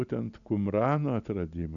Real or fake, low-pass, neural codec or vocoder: real; 7.2 kHz; none